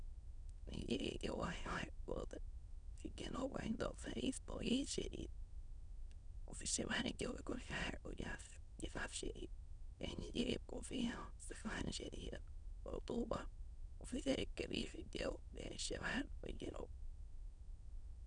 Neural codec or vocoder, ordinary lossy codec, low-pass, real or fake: autoencoder, 22.05 kHz, a latent of 192 numbers a frame, VITS, trained on many speakers; none; 9.9 kHz; fake